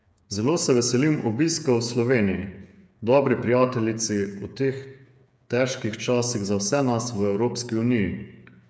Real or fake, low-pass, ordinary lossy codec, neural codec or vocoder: fake; none; none; codec, 16 kHz, 8 kbps, FreqCodec, smaller model